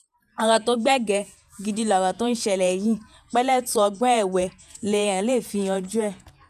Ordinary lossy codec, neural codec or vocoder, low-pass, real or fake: none; vocoder, 48 kHz, 128 mel bands, Vocos; 14.4 kHz; fake